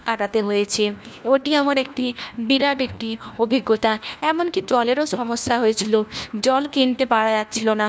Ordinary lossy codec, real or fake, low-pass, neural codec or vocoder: none; fake; none; codec, 16 kHz, 1 kbps, FunCodec, trained on LibriTTS, 50 frames a second